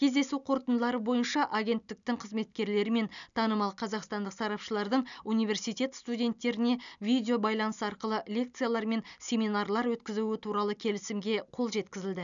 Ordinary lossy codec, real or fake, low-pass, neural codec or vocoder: none; real; 7.2 kHz; none